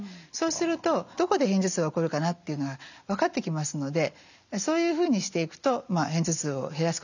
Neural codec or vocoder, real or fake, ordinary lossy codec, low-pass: none; real; none; 7.2 kHz